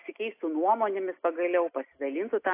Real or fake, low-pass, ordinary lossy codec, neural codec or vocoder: real; 3.6 kHz; AAC, 24 kbps; none